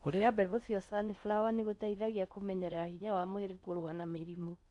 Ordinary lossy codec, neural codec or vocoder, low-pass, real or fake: none; codec, 16 kHz in and 24 kHz out, 0.6 kbps, FocalCodec, streaming, 4096 codes; 10.8 kHz; fake